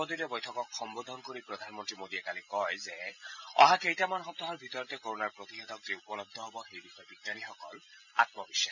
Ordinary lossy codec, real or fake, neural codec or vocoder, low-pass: none; real; none; 7.2 kHz